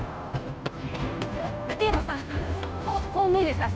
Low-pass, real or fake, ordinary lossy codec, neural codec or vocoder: none; fake; none; codec, 16 kHz, 0.5 kbps, FunCodec, trained on Chinese and English, 25 frames a second